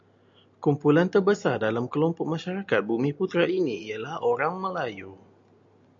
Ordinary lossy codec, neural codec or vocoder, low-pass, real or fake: MP3, 48 kbps; none; 7.2 kHz; real